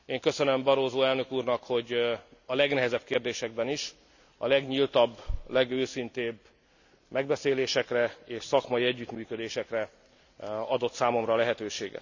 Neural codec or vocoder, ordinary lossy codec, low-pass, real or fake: none; none; 7.2 kHz; real